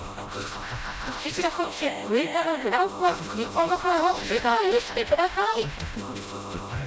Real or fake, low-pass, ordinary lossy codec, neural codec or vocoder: fake; none; none; codec, 16 kHz, 0.5 kbps, FreqCodec, smaller model